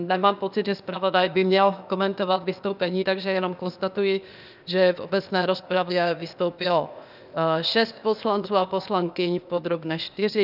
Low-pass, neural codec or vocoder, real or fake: 5.4 kHz; codec, 16 kHz, 0.8 kbps, ZipCodec; fake